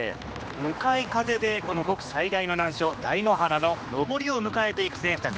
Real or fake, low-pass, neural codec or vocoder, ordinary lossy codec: fake; none; codec, 16 kHz, 2 kbps, X-Codec, HuBERT features, trained on general audio; none